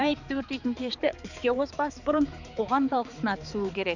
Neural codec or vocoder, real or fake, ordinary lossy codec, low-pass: codec, 16 kHz, 4 kbps, X-Codec, HuBERT features, trained on balanced general audio; fake; none; 7.2 kHz